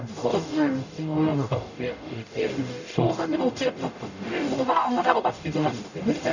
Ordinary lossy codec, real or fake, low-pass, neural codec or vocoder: none; fake; 7.2 kHz; codec, 44.1 kHz, 0.9 kbps, DAC